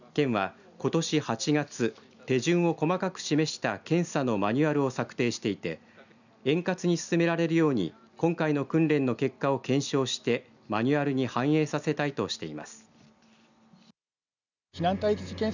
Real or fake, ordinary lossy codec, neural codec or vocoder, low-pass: real; none; none; 7.2 kHz